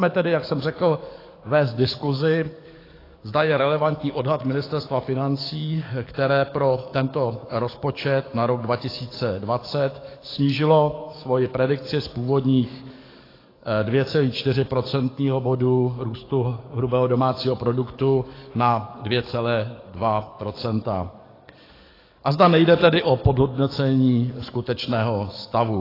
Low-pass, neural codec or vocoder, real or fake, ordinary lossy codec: 5.4 kHz; codec, 44.1 kHz, 7.8 kbps, DAC; fake; AAC, 24 kbps